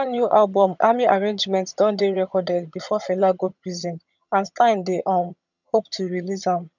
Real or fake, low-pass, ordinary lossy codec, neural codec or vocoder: fake; 7.2 kHz; none; vocoder, 22.05 kHz, 80 mel bands, HiFi-GAN